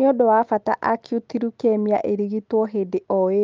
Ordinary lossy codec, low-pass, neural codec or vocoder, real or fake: Opus, 24 kbps; 7.2 kHz; none; real